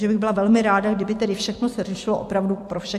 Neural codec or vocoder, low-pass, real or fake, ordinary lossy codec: vocoder, 44.1 kHz, 128 mel bands every 256 samples, BigVGAN v2; 14.4 kHz; fake; MP3, 64 kbps